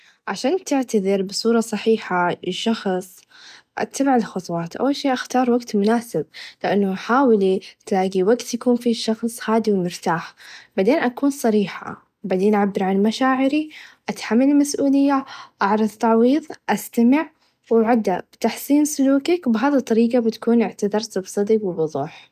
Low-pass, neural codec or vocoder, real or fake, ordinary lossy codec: 14.4 kHz; none; real; none